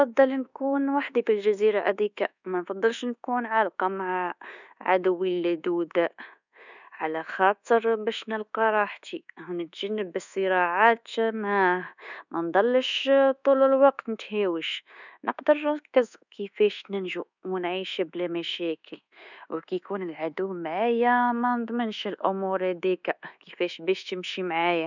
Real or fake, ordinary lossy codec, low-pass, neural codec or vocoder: fake; none; 7.2 kHz; codec, 24 kHz, 1.2 kbps, DualCodec